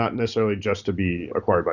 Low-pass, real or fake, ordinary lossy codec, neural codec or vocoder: 7.2 kHz; real; Opus, 64 kbps; none